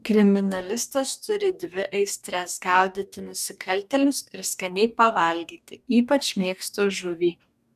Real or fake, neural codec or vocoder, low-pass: fake; codec, 44.1 kHz, 2.6 kbps, DAC; 14.4 kHz